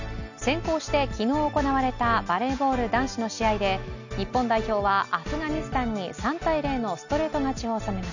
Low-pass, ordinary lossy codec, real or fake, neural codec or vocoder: 7.2 kHz; none; real; none